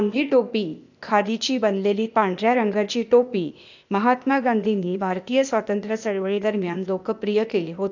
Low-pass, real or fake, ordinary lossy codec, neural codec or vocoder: 7.2 kHz; fake; none; codec, 16 kHz, 0.8 kbps, ZipCodec